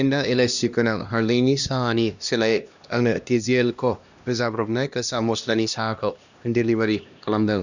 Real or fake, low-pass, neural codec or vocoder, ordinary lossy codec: fake; 7.2 kHz; codec, 16 kHz, 1 kbps, X-Codec, HuBERT features, trained on LibriSpeech; none